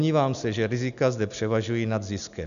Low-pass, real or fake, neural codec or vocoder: 7.2 kHz; real; none